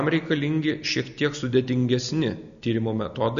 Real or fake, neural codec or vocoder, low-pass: real; none; 7.2 kHz